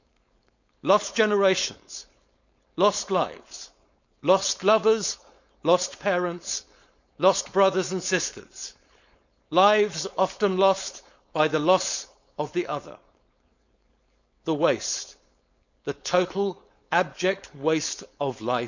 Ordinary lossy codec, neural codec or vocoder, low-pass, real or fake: none; codec, 16 kHz, 4.8 kbps, FACodec; 7.2 kHz; fake